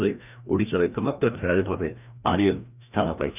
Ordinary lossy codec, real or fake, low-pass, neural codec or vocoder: none; fake; 3.6 kHz; codec, 16 kHz, 1 kbps, FreqCodec, larger model